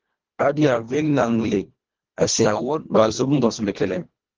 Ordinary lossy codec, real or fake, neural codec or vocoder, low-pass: Opus, 16 kbps; fake; codec, 24 kHz, 1.5 kbps, HILCodec; 7.2 kHz